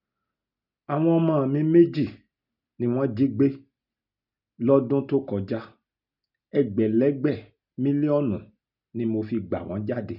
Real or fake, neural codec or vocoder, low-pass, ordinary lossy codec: real; none; 5.4 kHz; none